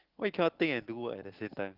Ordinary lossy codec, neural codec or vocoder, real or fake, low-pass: Opus, 16 kbps; none; real; 5.4 kHz